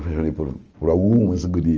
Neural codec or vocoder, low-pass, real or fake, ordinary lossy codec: none; 7.2 kHz; real; Opus, 24 kbps